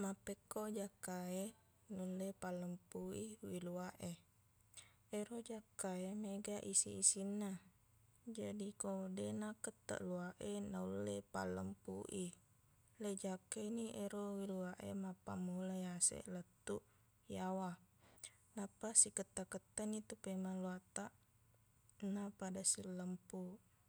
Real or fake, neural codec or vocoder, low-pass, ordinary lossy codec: real; none; none; none